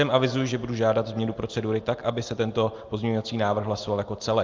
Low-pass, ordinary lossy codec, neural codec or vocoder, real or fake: 7.2 kHz; Opus, 32 kbps; none; real